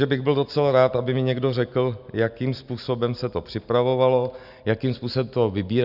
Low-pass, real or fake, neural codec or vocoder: 5.4 kHz; real; none